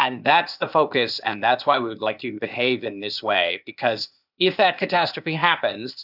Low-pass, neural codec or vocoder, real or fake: 5.4 kHz; codec, 16 kHz, 0.8 kbps, ZipCodec; fake